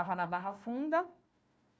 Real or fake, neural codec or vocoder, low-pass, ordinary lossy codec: fake; codec, 16 kHz, 1 kbps, FunCodec, trained on Chinese and English, 50 frames a second; none; none